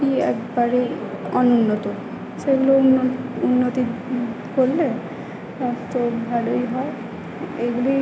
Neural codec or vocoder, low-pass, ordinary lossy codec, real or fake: none; none; none; real